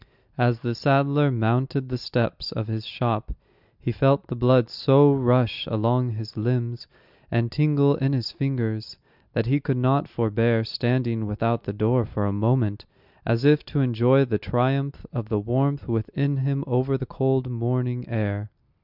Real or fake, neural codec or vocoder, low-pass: real; none; 5.4 kHz